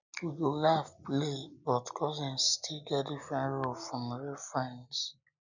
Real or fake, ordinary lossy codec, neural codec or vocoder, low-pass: real; none; none; 7.2 kHz